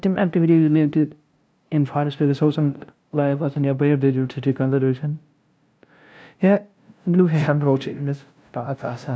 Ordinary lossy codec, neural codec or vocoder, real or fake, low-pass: none; codec, 16 kHz, 0.5 kbps, FunCodec, trained on LibriTTS, 25 frames a second; fake; none